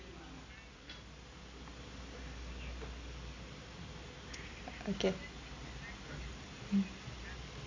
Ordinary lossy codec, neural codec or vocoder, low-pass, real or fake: AAC, 32 kbps; none; 7.2 kHz; real